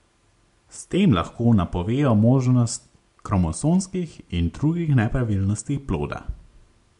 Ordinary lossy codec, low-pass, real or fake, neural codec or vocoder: MP3, 64 kbps; 10.8 kHz; real; none